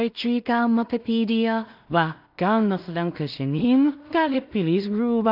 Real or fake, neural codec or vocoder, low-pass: fake; codec, 16 kHz in and 24 kHz out, 0.4 kbps, LongCat-Audio-Codec, two codebook decoder; 5.4 kHz